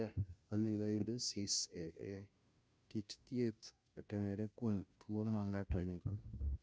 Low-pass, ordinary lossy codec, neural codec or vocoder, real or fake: none; none; codec, 16 kHz, 0.5 kbps, FunCodec, trained on Chinese and English, 25 frames a second; fake